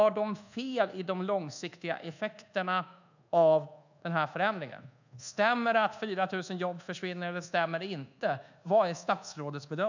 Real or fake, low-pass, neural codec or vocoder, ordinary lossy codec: fake; 7.2 kHz; codec, 24 kHz, 1.2 kbps, DualCodec; none